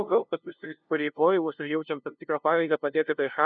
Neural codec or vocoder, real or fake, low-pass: codec, 16 kHz, 0.5 kbps, FunCodec, trained on LibriTTS, 25 frames a second; fake; 7.2 kHz